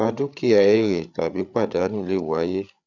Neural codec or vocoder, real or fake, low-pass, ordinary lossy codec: codec, 16 kHz, 8 kbps, FreqCodec, larger model; fake; 7.2 kHz; none